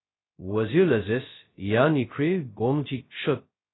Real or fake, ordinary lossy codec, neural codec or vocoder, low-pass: fake; AAC, 16 kbps; codec, 16 kHz, 0.2 kbps, FocalCodec; 7.2 kHz